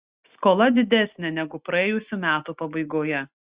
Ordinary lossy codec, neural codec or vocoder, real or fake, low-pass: Opus, 64 kbps; none; real; 3.6 kHz